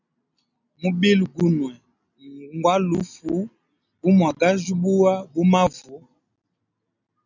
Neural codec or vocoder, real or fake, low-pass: none; real; 7.2 kHz